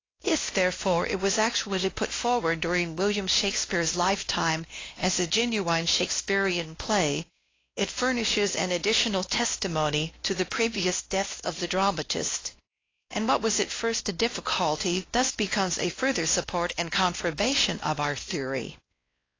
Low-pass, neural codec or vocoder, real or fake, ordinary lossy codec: 7.2 kHz; codec, 16 kHz, 1 kbps, X-Codec, WavLM features, trained on Multilingual LibriSpeech; fake; AAC, 32 kbps